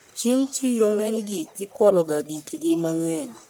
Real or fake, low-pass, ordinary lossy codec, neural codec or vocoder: fake; none; none; codec, 44.1 kHz, 1.7 kbps, Pupu-Codec